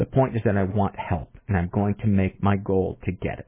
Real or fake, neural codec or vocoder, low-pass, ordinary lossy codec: real; none; 3.6 kHz; MP3, 16 kbps